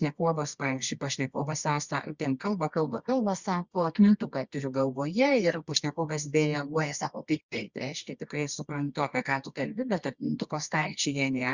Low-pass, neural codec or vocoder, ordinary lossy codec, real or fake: 7.2 kHz; codec, 24 kHz, 0.9 kbps, WavTokenizer, medium music audio release; Opus, 64 kbps; fake